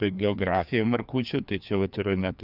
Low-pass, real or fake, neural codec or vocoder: 5.4 kHz; fake; codec, 16 kHz, 2 kbps, FreqCodec, larger model